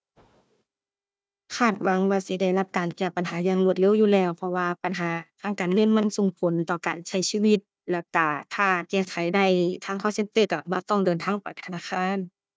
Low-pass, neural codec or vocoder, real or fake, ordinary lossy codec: none; codec, 16 kHz, 1 kbps, FunCodec, trained on Chinese and English, 50 frames a second; fake; none